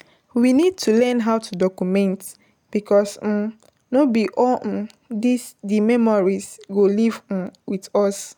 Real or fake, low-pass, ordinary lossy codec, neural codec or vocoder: real; none; none; none